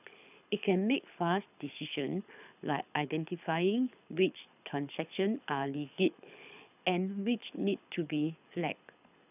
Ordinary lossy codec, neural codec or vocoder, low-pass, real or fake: none; codec, 24 kHz, 6 kbps, HILCodec; 3.6 kHz; fake